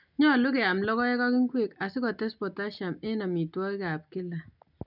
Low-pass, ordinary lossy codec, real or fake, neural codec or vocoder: 5.4 kHz; none; real; none